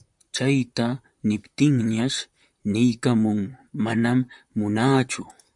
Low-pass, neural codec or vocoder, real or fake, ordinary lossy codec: 10.8 kHz; vocoder, 44.1 kHz, 128 mel bands, Pupu-Vocoder; fake; MP3, 96 kbps